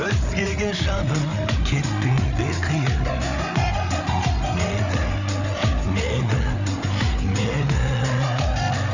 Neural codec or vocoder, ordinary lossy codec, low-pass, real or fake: codec, 16 kHz, 8 kbps, FreqCodec, larger model; none; 7.2 kHz; fake